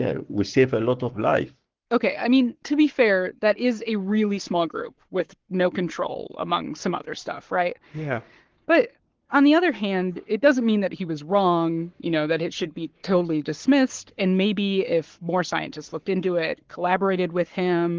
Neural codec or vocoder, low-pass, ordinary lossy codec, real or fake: codec, 44.1 kHz, 7.8 kbps, Pupu-Codec; 7.2 kHz; Opus, 16 kbps; fake